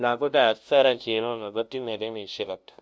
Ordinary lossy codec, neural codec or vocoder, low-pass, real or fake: none; codec, 16 kHz, 0.5 kbps, FunCodec, trained on LibriTTS, 25 frames a second; none; fake